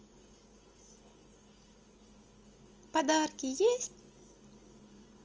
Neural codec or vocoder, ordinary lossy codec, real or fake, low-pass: none; Opus, 24 kbps; real; 7.2 kHz